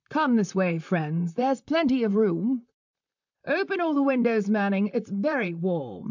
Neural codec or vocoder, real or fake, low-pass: vocoder, 44.1 kHz, 128 mel bands, Pupu-Vocoder; fake; 7.2 kHz